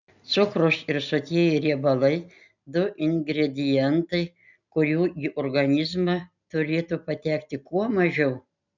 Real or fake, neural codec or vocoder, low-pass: real; none; 7.2 kHz